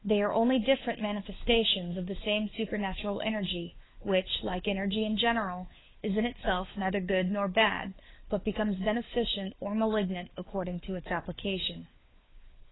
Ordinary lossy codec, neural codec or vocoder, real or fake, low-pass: AAC, 16 kbps; codec, 16 kHz, 4 kbps, FunCodec, trained on LibriTTS, 50 frames a second; fake; 7.2 kHz